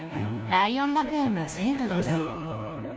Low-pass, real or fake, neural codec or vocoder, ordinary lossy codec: none; fake; codec, 16 kHz, 1 kbps, FunCodec, trained on LibriTTS, 50 frames a second; none